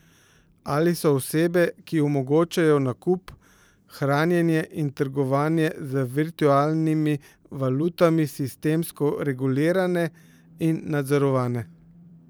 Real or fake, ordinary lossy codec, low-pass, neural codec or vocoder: fake; none; none; vocoder, 44.1 kHz, 128 mel bands every 512 samples, BigVGAN v2